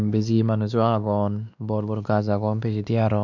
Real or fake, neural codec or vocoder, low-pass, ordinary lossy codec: fake; codec, 16 kHz, 2 kbps, X-Codec, WavLM features, trained on Multilingual LibriSpeech; 7.2 kHz; none